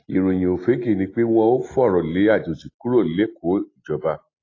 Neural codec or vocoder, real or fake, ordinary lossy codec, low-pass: none; real; AAC, 32 kbps; 7.2 kHz